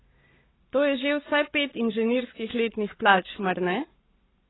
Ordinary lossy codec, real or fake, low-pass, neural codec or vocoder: AAC, 16 kbps; fake; 7.2 kHz; codec, 44.1 kHz, 7.8 kbps, Pupu-Codec